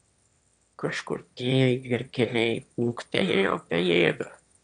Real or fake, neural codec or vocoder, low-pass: fake; autoencoder, 22.05 kHz, a latent of 192 numbers a frame, VITS, trained on one speaker; 9.9 kHz